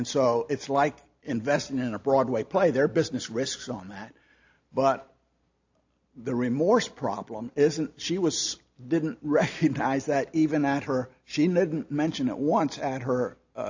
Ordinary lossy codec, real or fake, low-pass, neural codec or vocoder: AAC, 48 kbps; real; 7.2 kHz; none